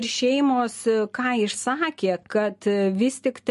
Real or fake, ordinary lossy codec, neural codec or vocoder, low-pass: real; MP3, 48 kbps; none; 14.4 kHz